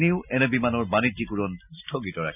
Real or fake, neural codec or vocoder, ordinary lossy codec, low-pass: real; none; none; 3.6 kHz